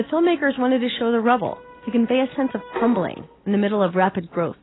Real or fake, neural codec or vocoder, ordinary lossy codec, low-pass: real; none; AAC, 16 kbps; 7.2 kHz